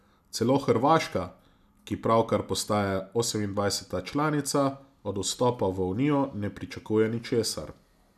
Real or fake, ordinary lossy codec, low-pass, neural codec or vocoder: real; none; 14.4 kHz; none